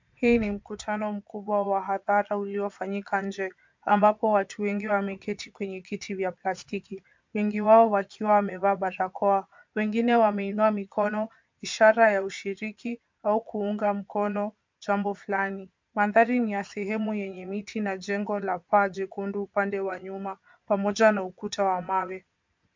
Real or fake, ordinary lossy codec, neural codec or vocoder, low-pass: fake; MP3, 64 kbps; vocoder, 22.05 kHz, 80 mel bands, Vocos; 7.2 kHz